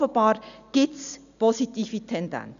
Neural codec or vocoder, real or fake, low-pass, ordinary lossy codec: none; real; 7.2 kHz; none